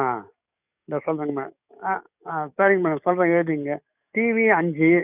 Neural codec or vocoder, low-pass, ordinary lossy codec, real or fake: codec, 44.1 kHz, 7.8 kbps, DAC; 3.6 kHz; none; fake